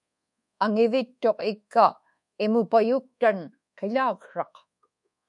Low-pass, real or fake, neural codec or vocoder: 10.8 kHz; fake; codec, 24 kHz, 1.2 kbps, DualCodec